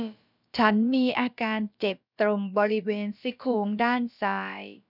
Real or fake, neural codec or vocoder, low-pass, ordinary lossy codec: fake; codec, 16 kHz, about 1 kbps, DyCAST, with the encoder's durations; 5.4 kHz; none